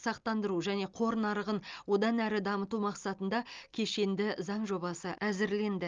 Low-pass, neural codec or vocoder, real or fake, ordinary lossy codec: 7.2 kHz; none; real; Opus, 32 kbps